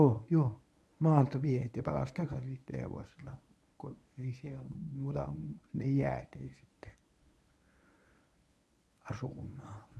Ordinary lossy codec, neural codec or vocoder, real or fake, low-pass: none; codec, 24 kHz, 0.9 kbps, WavTokenizer, medium speech release version 1; fake; none